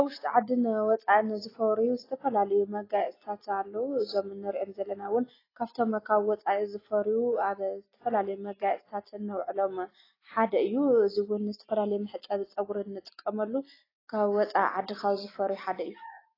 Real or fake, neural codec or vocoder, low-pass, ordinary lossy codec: real; none; 5.4 kHz; AAC, 24 kbps